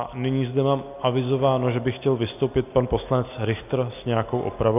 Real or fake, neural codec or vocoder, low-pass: real; none; 3.6 kHz